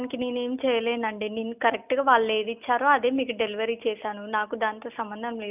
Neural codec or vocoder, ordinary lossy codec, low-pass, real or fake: none; none; 3.6 kHz; real